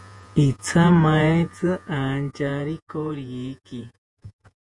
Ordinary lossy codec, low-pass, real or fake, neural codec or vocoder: MP3, 64 kbps; 10.8 kHz; fake; vocoder, 48 kHz, 128 mel bands, Vocos